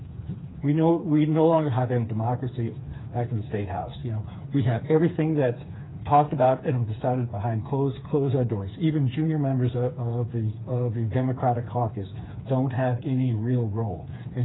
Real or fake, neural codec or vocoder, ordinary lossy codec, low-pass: fake; codec, 16 kHz, 4 kbps, FreqCodec, smaller model; AAC, 16 kbps; 7.2 kHz